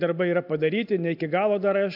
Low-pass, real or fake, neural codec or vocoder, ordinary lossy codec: 5.4 kHz; real; none; AAC, 48 kbps